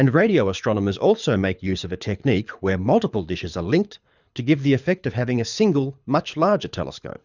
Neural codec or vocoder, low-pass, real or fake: codec, 24 kHz, 6 kbps, HILCodec; 7.2 kHz; fake